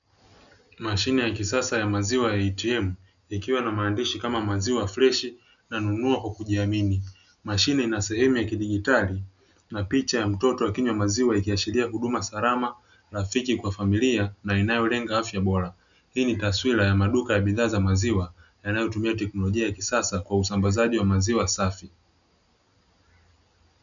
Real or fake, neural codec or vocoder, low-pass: real; none; 7.2 kHz